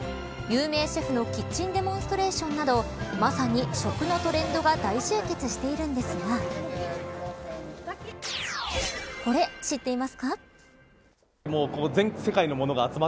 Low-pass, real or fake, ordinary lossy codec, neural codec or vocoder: none; real; none; none